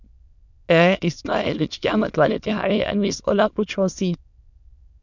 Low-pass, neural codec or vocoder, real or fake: 7.2 kHz; autoencoder, 22.05 kHz, a latent of 192 numbers a frame, VITS, trained on many speakers; fake